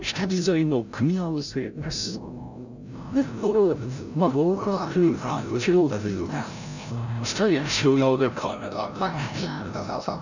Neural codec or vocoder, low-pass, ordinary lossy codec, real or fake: codec, 16 kHz, 0.5 kbps, FreqCodec, larger model; 7.2 kHz; none; fake